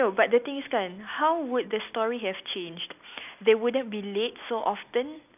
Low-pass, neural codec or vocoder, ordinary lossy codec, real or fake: 3.6 kHz; none; none; real